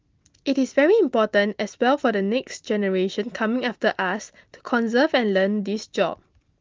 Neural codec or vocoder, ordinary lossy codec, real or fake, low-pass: none; Opus, 32 kbps; real; 7.2 kHz